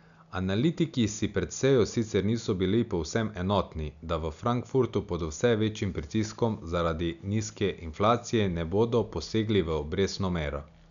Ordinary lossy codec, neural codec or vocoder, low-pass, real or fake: none; none; 7.2 kHz; real